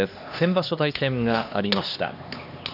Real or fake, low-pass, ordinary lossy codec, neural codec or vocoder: fake; 5.4 kHz; none; codec, 16 kHz, 2 kbps, X-Codec, HuBERT features, trained on LibriSpeech